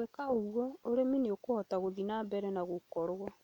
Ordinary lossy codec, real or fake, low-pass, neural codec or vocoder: MP3, 96 kbps; real; 19.8 kHz; none